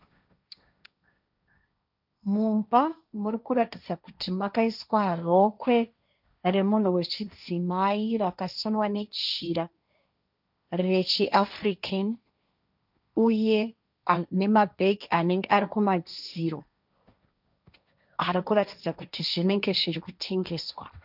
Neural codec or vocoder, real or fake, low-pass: codec, 16 kHz, 1.1 kbps, Voila-Tokenizer; fake; 5.4 kHz